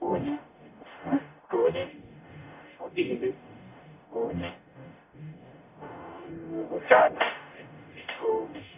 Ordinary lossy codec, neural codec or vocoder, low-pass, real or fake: none; codec, 44.1 kHz, 0.9 kbps, DAC; 3.6 kHz; fake